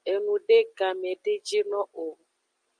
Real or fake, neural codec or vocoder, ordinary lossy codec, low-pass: real; none; Opus, 32 kbps; 9.9 kHz